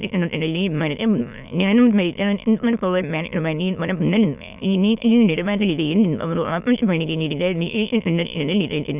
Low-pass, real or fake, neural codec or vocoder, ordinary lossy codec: 3.6 kHz; fake; autoencoder, 22.05 kHz, a latent of 192 numbers a frame, VITS, trained on many speakers; none